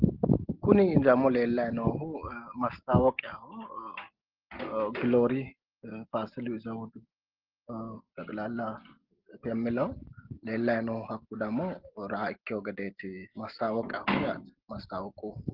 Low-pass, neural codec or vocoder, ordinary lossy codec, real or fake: 5.4 kHz; none; Opus, 16 kbps; real